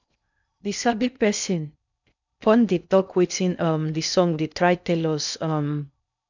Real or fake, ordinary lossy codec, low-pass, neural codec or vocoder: fake; none; 7.2 kHz; codec, 16 kHz in and 24 kHz out, 0.6 kbps, FocalCodec, streaming, 2048 codes